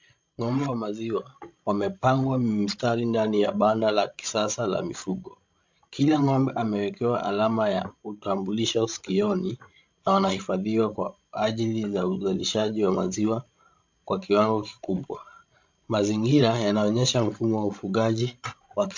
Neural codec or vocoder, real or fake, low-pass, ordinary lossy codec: codec, 16 kHz, 16 kbps, FreqCodec, larger model; fake; 7.2 kHz; MP3, 64 kbps